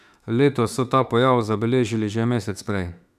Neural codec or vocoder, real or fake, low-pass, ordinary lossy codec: autoencoder, 48 kHz, 32 numbers a frame, DAC-VAE, trained on Japanese speech; fake; 14.4 kHz; none